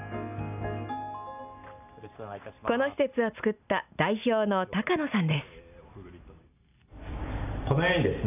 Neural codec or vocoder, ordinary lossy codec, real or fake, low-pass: none; none; real; 3.6 kHz